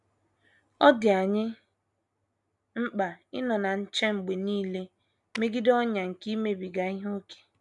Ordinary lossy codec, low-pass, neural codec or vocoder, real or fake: none; 10.8 kHz; none; real